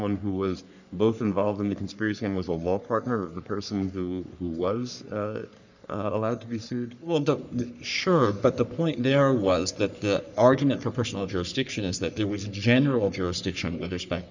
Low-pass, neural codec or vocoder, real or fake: 7.2 kHz; codec, 44.1 kHz, 3.4 kbps, Pupu-Codec; fake